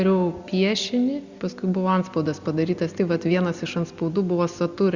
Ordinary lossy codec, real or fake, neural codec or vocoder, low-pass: Opus, 64 kbps; real; none; 7.2 kHz